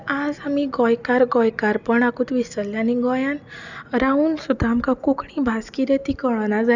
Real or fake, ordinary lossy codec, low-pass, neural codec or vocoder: real; none; 7.2 kHz; none